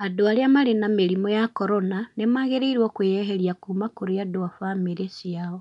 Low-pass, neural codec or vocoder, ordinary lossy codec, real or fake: 10.8 kHz; none; none; real